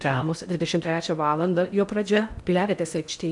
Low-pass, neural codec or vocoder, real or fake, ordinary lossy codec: 10.8 kHz; codec, 16 kHz in and 24 kHz out, 0.6 kbps, FocalCodec, streaming, 4096 codes; fake; MP3, 96 kbps